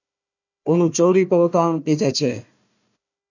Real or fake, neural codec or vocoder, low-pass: fake; codec, 16 kHz, 1 kbps, FunCodec, trained on Chinese and English, 50 frames a second; 7.2 kHz